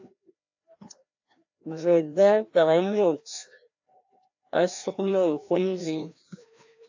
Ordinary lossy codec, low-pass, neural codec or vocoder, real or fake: AAC, 48 kbps; 7.2 kHz; codec, 16 kHz, 1 kbps, FreqCodec, larger model; fake